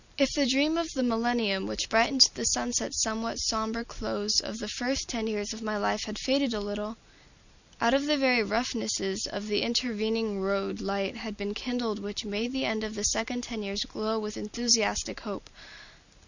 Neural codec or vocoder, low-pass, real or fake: none; 7.2 kHz; real